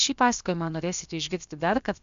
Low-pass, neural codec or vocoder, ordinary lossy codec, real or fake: 7.2 kHz; codec, 16 kHz, about 1 kbps, DyCAST, with the encoder's durations; MP3, 64 kbps; fake